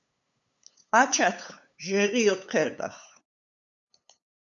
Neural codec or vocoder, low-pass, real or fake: codec, 16 kHz, 8 kbps, FunCodec, trained on LibriTTS, 25 frames a second; 7.2 kHz; fake